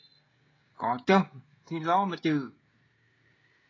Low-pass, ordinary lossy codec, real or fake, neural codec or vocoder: 7.2 kHz; AAC, 32 kbps; fake; codec, 16 kHz, 16 kbps, FreqCodec, smaller model